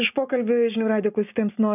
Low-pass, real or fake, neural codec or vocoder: 3.6 kHz; real; none